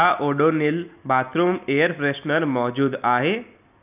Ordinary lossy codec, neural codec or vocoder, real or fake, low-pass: none; none; real; 3.6 kHz